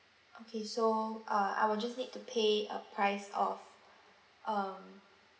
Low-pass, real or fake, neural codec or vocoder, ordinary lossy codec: none; real; none; none